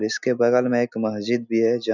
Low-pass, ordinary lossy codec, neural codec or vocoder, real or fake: 7.2 kHz; none; none; real